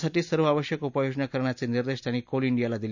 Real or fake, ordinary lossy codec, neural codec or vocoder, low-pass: real; none; none; 7.2 kHz